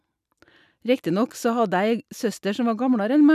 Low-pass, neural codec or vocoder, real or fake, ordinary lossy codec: 14.4 kHz; none; real; none